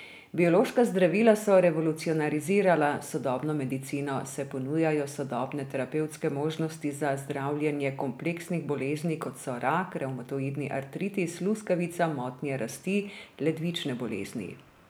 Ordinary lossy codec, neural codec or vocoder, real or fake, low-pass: none; none; real; none